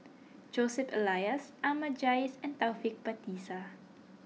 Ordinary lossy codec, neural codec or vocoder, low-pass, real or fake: none; none; none; real